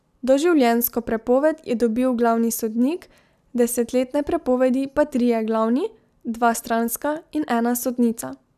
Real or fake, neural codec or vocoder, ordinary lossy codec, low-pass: real; none; none; 14.4 kHz